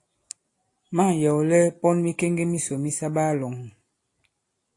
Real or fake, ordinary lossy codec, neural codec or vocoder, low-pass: real; AAC, 48 kbps; none; 10.8 kHz